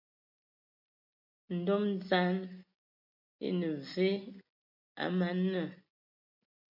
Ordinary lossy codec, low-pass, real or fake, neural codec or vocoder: MP3, 48 kbps; 5.4 kHz; real; none